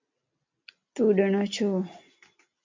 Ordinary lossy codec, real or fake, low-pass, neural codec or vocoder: MP3, 48 kbps; real; 7.2 kHz; none